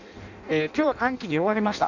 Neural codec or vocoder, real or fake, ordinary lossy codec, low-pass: codec, 16 kHz in and 24 kHz out, 0.6 kbps, FireRedTTS-2 codec; fake; none; 7.2 kHz